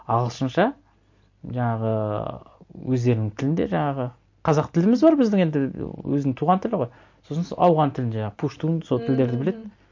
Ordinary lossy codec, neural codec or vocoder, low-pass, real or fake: MP3, 48 kbps; none; 7.2 kHz; real